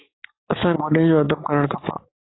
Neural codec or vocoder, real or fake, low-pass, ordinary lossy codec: codec, 44.1 kHz, 7.8 kbps, DAC; fake; 7.2 kHz; AAC, 16 kbps